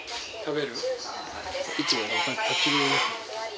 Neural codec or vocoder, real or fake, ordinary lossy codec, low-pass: none; real; none; none